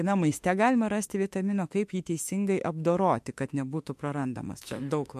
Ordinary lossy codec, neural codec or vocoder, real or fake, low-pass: MP3, 64 kbps; autoencoder, 48 kHz, 32 numbers a frame, DAC-VAE, trained on Japanese speech; fake; 14.4 kHz